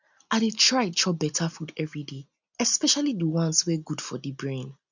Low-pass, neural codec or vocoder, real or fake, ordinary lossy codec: 7.2 kHz; none; real; none